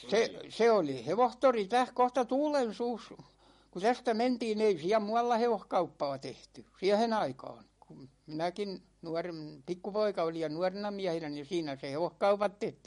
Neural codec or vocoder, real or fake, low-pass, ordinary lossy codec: none; real; 19.8 kHz; MP3, 48 kbps